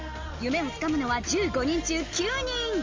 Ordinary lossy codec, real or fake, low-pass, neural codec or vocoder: Opus, 32 kbps; real; 7.2 kHz; none